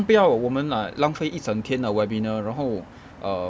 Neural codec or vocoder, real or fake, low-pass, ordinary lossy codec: none; real; none; none